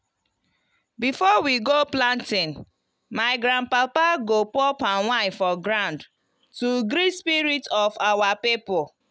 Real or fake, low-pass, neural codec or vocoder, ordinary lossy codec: real; none; none; none